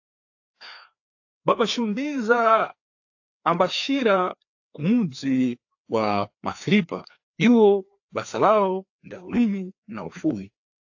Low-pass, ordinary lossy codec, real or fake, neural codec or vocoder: 7.2 kHz; AAC, 48 kbps; fake; codec, 16 kHz, 2 kbps, FreqCodec, larger model